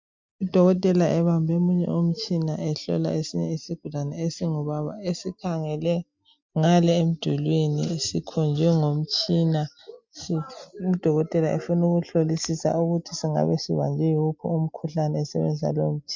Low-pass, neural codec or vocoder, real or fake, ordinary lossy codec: 7.2 kHz; none; real; MP3, 64 kbps